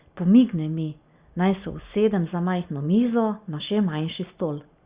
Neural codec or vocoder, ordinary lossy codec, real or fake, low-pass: none; Opus, 64 kbps; real; 3.6 kHz